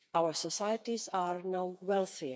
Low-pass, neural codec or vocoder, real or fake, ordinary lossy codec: none; codec, 16 kHz, 4 kbps, FreqCodec, smaller model; fake; none